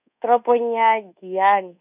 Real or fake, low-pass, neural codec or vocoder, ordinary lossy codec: fake; 3.6 kHz; codec, 24 kHz, 1.2 kbps, DualCodec; none